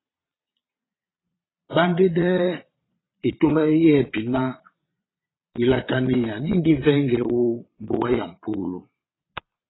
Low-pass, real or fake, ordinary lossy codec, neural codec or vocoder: 7.2 kHz; fake; AAC, 16 kbps; vocoder, 22.05 kHz, 80 mel bands, Vocos